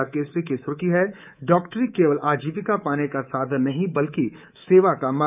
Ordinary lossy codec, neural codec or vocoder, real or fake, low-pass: none; codec, 24 kHz, 3.1 kbps, DualCodec; fake; 3.6 kHz